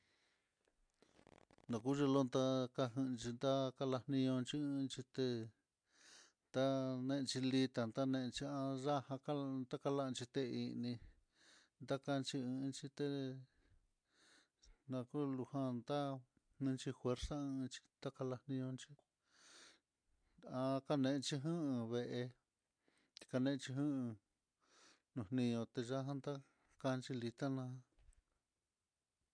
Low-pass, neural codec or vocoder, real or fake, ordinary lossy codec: 9.9 kHz; none; real; MP3, 64 kbps